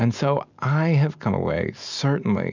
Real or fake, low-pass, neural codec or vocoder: real; 7.2 kHz; none